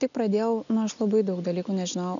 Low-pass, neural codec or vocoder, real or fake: 7.2 kHz; none; real